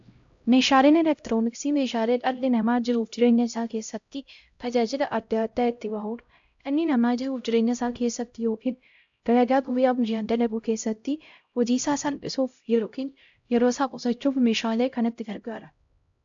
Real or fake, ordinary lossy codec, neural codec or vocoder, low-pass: fake; AAC, 64 kbps; codec, 16 kHz, 0.5 kbps, X-Codec, HuBERT features, trained on LibriSpeech; 7.2 kHz